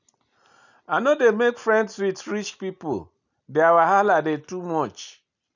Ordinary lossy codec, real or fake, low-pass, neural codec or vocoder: none; real; 7.2 kHz; none